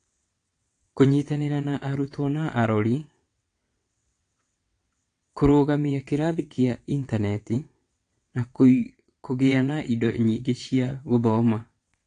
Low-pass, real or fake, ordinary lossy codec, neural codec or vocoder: 9.9 kHz; fake; AAC, 48 kbps; vocoder, 22.05 kHz, 80 mel bands, WaveNeXt